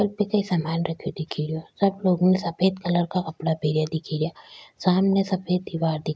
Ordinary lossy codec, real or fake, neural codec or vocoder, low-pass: none; real; none; none